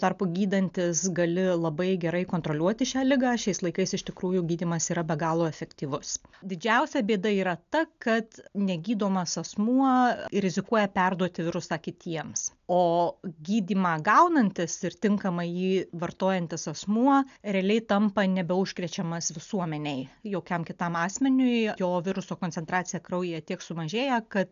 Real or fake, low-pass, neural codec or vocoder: real; 7.2 kHz; none